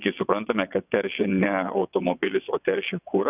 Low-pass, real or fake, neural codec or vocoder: 3.6 kHz; fake; vocoder, 22.05 kHz, 80 mel bands, WaveNeXt